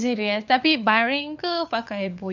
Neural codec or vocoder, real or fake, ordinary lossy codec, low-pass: codec, 16 kHz, 4 kbps, FunCodec, trained on LibriTTS, 50 frames a second; fake; none; 7.2 kHz